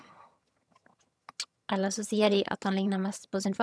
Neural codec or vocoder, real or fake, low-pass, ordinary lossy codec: vocoder, 22.05 kHz, 80 mel bands, HiFi-GAN; fake; none; none